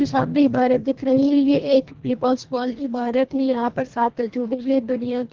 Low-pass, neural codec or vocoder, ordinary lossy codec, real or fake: 7.2 kHz; codec, 24 kHz, 1.5 kbps, HILCodec; Opus, 16 kbps; fake